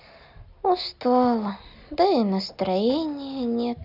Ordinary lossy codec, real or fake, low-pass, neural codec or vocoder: none; real; 5.4 kHz; none